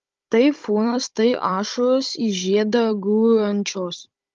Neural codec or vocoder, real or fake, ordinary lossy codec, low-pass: codec, 16 kHz, 16 kbps, FunCodec, trained on Chinese and English, 50 frames a second; fake; Opus, 32 kbps; 7.2 kHz